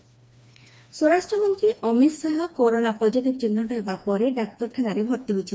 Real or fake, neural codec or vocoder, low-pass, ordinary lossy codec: fake; codec, 16 kHz, 2 kbps, FreqCodec, smaller model; none; none